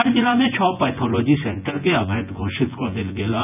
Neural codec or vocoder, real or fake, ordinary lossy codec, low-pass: vocoder, 24 kHz, 100 mel bands, Vocos; fake; none; 3.6 kHz